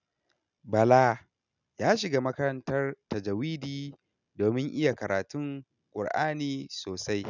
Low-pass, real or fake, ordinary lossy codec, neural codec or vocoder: 7.2 kHz; real; none; none